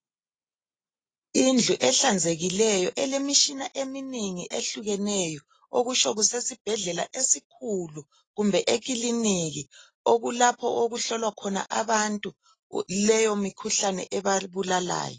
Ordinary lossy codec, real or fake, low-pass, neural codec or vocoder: AAC, 32 kbps; real; 9.9 kHz; none